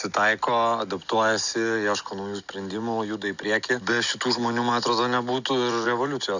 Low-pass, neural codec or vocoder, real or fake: 7.2 kHz; none; real